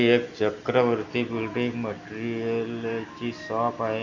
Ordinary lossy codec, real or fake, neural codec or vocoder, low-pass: none; real; none; 7.2 kHz